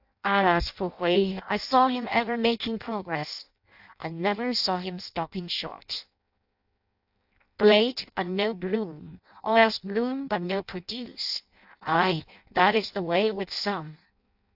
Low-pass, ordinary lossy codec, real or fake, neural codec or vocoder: 5.4 kHz; AAC, 48 kbps; fake; codec, 16 kHz in and 24 kHz out, 0.6 kbps, FireRedTTS-2 codec